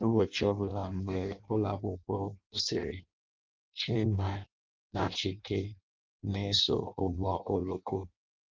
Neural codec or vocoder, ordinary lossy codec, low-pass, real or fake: codec, 16 kHz in and 24 kHz out, 0.6 kbps, FireRedTTS-2 codec; Opus, 32 kbps; 7.2 kHz; fake